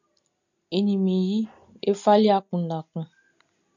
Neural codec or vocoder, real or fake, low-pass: none; real; 7.2 kHz